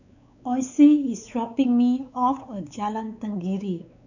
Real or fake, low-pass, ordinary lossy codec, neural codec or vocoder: fake; 7.2 kHz; none; codec, 16 kHz, 4 kbps, X-Codec, WavLM features, trained on Multilingual LibriSpeech